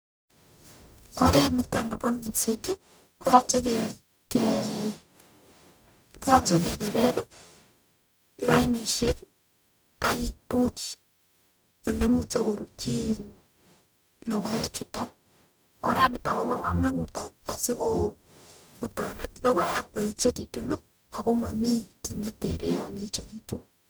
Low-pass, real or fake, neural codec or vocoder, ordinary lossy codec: none; fake; codec, 44.1 kHz, 0.9 kbps, DAC; none